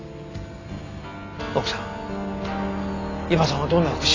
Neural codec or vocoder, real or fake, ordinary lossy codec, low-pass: none; real; AAC, 32 kbps; 7.2 kHz